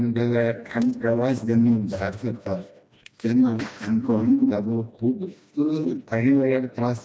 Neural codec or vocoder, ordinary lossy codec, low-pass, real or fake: codec, 16 kHz, 1 kbps, FreqCodec, smaller model; none; none; fake